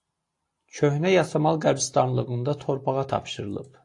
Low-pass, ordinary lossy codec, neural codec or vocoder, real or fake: 10.8 kHz; AAC, 48 kbps; none; real